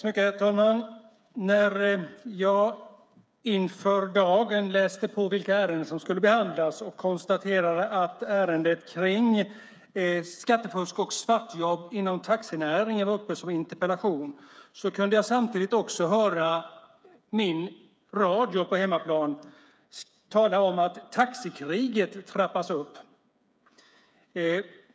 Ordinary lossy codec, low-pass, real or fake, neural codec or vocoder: none; none; fake; codec, 16 kHz, 8 kbps, FreqCodec, smaller model